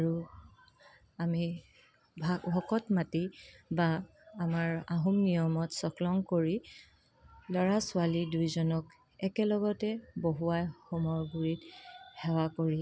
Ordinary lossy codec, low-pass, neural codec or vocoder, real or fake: none; none; none; real